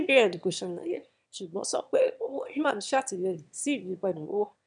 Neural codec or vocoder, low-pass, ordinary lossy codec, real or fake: autoencoder, 22.05 kHz, a latent of 192 numbers a frame, VITS, trained on one speaker; 9.9 kHz; none; fake